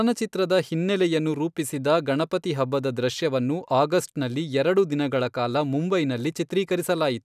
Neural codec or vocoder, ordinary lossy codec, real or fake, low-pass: none; none; real; 14.4 kHz